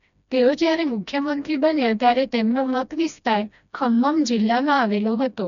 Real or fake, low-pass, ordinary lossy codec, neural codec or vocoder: fake; 7.2 kHz; none; codec, 16 kHz, 1 kbps, FreqCodec, smaller model